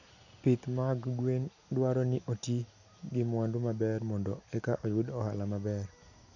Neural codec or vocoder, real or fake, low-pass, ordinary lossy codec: none; real; 7.2 kHz; none